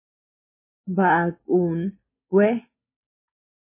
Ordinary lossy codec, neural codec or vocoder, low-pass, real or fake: MP3, 16 kbps; none; 3.6 kHz; real